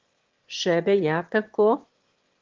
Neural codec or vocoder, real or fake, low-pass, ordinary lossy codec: autoencoder, 22.05 kHz, a latent of 192 numbers a frame, VITS, trained on one speaker; fake; 7.2 kHz; Opus, 16 kbps